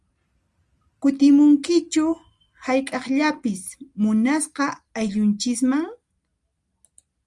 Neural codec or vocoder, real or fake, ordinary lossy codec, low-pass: none; real; Opus, 32 kbps; 10.8 kHz